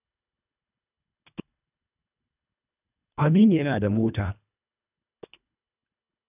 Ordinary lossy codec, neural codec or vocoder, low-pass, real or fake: none; codec, 24 kHz, 1.5 kbps, HILCodec; 3.6 kHz; fake